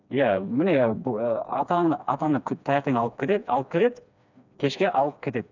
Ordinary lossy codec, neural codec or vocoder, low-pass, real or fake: none; codec, 16 kHz, 2 kbps, FreqCodec, smaller model; 7.2 kHz; fake